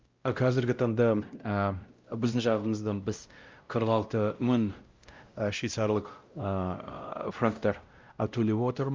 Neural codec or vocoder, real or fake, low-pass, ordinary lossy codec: codec, 16 kHz, 0.5 kbps, X-Codec, WavLM features, trained on Multilingual LibriSpeech; fake; 7.2 kHz; Opus, 24 kbps